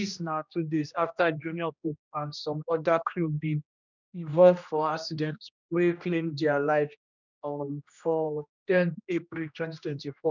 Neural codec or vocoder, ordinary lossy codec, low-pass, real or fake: codec, 16 kHz, 1 kbps, X-Codec, HuBERT features, trained on general audio; none; 7.2 kHz; fake